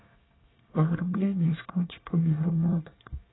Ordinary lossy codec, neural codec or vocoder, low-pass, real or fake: AAC, 16 kbps; codec, 24 kHz, 1 kbps, SNAC; 7.2 kHz; fake